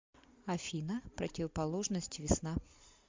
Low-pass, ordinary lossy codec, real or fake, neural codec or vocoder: 7.2 kHz; MP3, 64 kbps; real; none